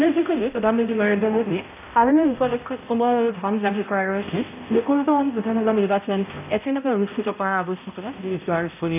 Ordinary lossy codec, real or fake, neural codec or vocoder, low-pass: none; fake; codec, 16 kHz, 0.5 kbps, X-Codec, HuBERT features, trained on balanced general audio; 3.6 kHz